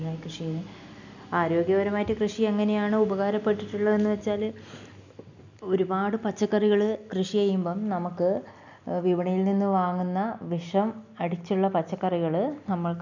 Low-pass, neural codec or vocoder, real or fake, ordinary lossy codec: 7.2 kHz; none; real; none